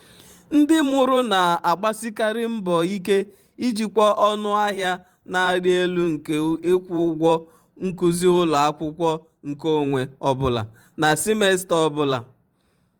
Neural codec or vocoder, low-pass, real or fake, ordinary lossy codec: vocoder, 44.1 kHz, 128 mel bands every 512 samples, BigVGAN v2; 19.8 kHz; fake; Opus, 32 kbps